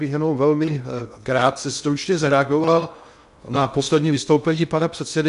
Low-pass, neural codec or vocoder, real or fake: 10.8 kHz; codec, 16 kHz in and 24 kHz out, 0.6 kbps, FocalCodec, streaming, 2048 codes; fake